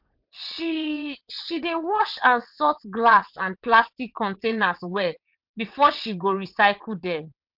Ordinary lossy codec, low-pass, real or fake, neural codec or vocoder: MP3, 48 kbps; 5.4 kHz; fake; vocoder, 22.05 kHz, 80 mel bands, WaveNeXt